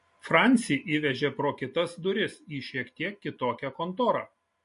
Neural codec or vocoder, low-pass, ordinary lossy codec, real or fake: none; 10.8 kHz; MP3, 48 kbps; real